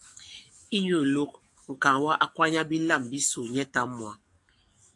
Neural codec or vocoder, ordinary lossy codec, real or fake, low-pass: codec, 44.1 kHz, 7.8 kbps, DAC; AAC, 64 kbps; fake; 10.8 kHz